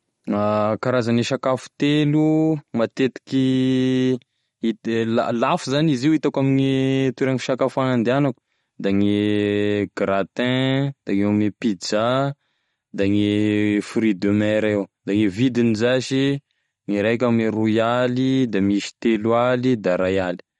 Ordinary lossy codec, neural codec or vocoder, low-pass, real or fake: MP3, 48 kbps; none; 19.8 kHz; real